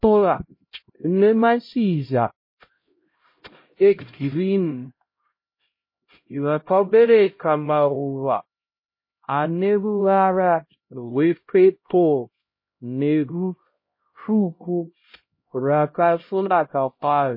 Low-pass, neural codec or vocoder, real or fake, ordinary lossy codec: 5.4 kHz; codec, 16 kHz, 0.5 kbps, X-Codec, HuBERT features, trained on LibriSpeech; fake; MP3, 24 kbps